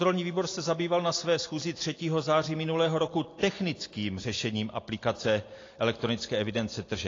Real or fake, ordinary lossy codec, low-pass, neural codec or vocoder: real; AAC, 32 kbps; 7.2 kHz; none